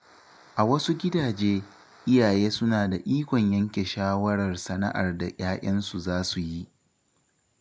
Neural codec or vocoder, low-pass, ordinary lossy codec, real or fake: none; none; none; real